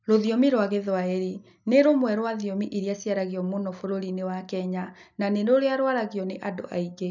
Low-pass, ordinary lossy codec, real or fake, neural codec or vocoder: 7.2 kHz; none; real; none